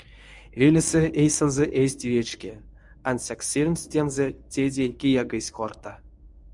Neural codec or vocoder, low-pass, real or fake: codec, 24 kHz, 0.9 kbps, WavTokenizer, medium speech release version 1; 10.8 kHz; fake